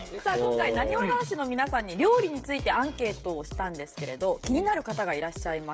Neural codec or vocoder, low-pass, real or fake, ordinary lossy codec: codec, 16 kHz, 16 kbps, FreqCodec, smaller model; none; fake; none